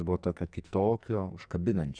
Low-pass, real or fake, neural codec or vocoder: 9.9 kHz; fake; codec, 44.1 kHz, 2.6 kbps, SNAC